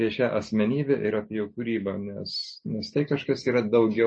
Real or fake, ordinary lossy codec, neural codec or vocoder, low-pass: real; MP3, 32 kbps; none; 9.9 kHz